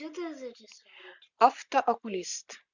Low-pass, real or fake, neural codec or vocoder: 7.2 kHz; real; none